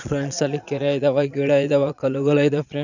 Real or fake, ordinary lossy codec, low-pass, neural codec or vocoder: fake; none; 7.2 kHz; vocoder, 22.05 kHz, 80 mel bands, Vocos